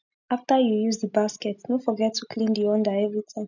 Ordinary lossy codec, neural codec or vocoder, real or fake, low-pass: none; none; real; 7.2 kHz